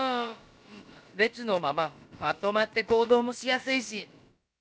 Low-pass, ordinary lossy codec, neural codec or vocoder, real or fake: none; none; codec, 16 kHz, about 1 kbps, DyCAST, with the encoder's durations; fake